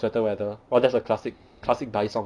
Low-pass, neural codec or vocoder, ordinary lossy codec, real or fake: 9.9 kHz; none; none; real